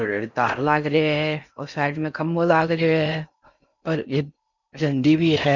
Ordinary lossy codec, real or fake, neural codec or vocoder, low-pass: none; fake; codec, 16 kHz in and 24 kHz out, 0.6 kbps, FocalCodec, streaming, 4096 codes; 7.2 kHz